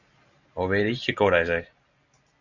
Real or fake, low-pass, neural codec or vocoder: real; 7.2 kHz; none